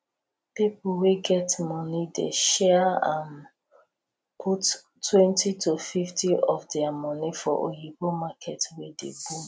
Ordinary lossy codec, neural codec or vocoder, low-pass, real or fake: none; none; none; real